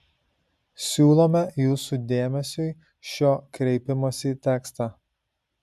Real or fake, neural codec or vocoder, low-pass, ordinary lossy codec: real; none; 14.4 kHz; MP3, 96 kbps